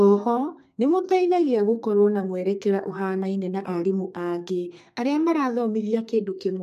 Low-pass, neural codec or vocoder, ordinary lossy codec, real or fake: 14.4 kHz; codec, 32 kHz, 1.9 kbps, SNAC; MP3, 64 kbps; fake